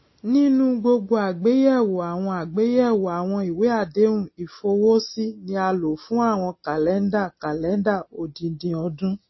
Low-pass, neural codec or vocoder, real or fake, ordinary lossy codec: 7.2 kHz; none; real; MP3, 24 kbps